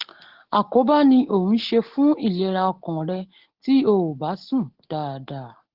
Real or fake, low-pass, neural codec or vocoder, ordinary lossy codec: real; 5.4 kHz; none; Opus, 16 kbps